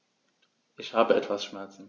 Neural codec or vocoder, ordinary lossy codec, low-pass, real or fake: none; none; 7.2 kHz; real